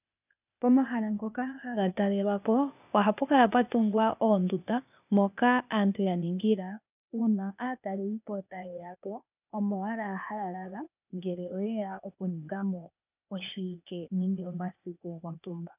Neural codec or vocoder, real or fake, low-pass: codec, 16 kHz, 0.8 kbps, ZipCodec; fake; 3.6 kHz